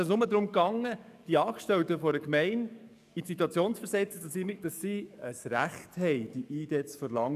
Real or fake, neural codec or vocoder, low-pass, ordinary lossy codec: fake; codec, 44.1 kHz, 7.8 kbps, DAC; 14.4 kHz; none